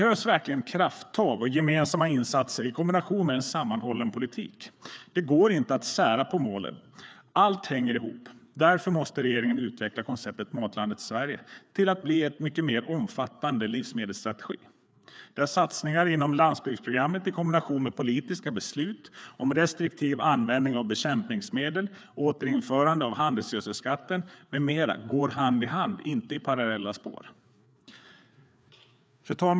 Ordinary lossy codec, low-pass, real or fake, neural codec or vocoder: none; none; fake; codec, 16 kHz, 4 kbps, FreqCodec, larger model